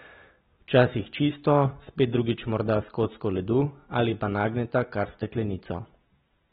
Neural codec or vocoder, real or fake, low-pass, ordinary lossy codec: none; real; 7.2 kHz; AAC, 16 kbps